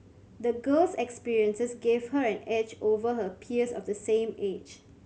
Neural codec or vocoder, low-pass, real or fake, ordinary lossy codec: none; none; real; none